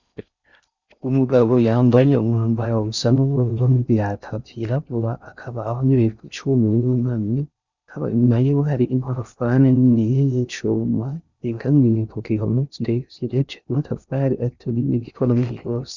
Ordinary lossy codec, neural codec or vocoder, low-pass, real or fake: Opus, 64 kbps; codec, 16 kHz in and 24 kHz out, 0.6 kbps, FocalCodec, streaming, 4096 codes; 7.2 kHz; fake